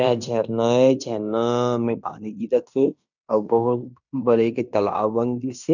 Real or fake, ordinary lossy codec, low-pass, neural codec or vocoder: fake; none; 7.2 kHz; codec, 16 kHz in and 24 kHz out, 0.9 kbps, LongCat-Audio-Codec, fine tuned four codebook decoder